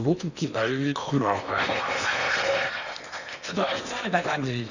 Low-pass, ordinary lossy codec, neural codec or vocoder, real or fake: 7.2 kHz; none; codec, 16 kHz in and 24 kHz out, 0.8 kbps, FocalCodec, streaming, 65536 codes; fake